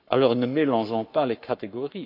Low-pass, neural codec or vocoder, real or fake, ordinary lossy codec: 5.4 kHz; codec, 44.1 kHz, 7.8 kbps, Pupu-Codec; fake; none